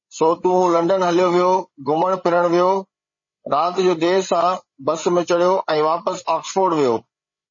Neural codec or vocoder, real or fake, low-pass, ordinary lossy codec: codec, 16 kHz, 8 kbps, FreqCodec, larger model; fake; 7.2 kHz; MP3, 32 kbps